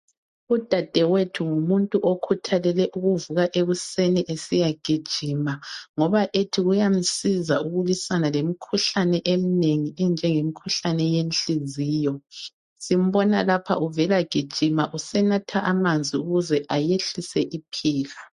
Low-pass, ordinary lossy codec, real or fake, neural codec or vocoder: 14.4 kHz; MP3, 48 kbps; real; none